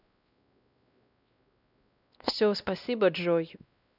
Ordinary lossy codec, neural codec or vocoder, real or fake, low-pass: none; codec, 16 kHz, 1 kbps, X-Codec, WavLM features, trained on Multilingual LibriSpeech; fake; 5.4 kHz